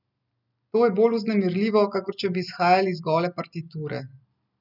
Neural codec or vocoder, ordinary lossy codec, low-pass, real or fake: none; none; 5.4 kHz; real